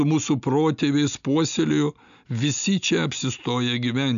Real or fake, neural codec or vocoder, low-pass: real; none; 7.2 kHz